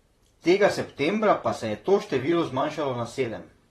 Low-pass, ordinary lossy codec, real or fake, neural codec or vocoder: 19.8 kHz; AAC, 32 kbps; fake; vocoder, 44.1 kHz, 128 mel bands, Pupu-Vocoder